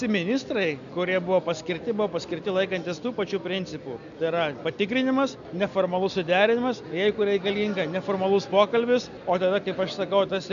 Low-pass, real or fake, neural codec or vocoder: 7.2 kHz; real; none